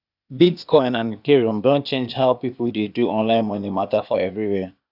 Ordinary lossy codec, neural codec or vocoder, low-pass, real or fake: none; codec, 16 kHz, 0.8 kbps, ZipCodec; 5.4 kHz; fake